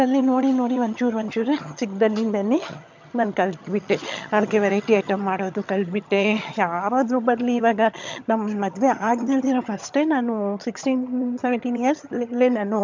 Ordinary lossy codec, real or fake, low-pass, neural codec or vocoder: MP3, 64 kbps; fake; 7.2 kHz; vocoder, 22.05 kHz, 80 mel bands, HiFi-GAN